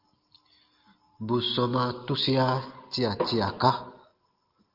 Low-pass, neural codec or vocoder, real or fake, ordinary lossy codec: 5.4 kHz; none; real; Opus, 32 kbps